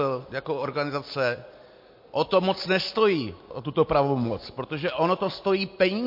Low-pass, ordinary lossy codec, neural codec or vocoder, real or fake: 5.4 kHz; MP3, 32 kbps; none; real